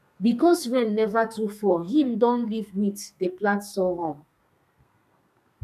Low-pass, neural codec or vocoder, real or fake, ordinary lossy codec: 14.4 kHz; codec, 32 kHz, 1.9 kbps, SNAC; fake; none